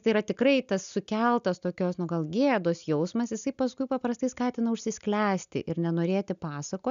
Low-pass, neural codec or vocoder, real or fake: 7.2 kHz; none; real